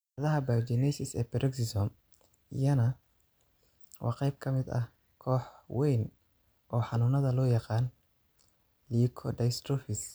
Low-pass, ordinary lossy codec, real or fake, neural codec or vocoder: none; none; real; none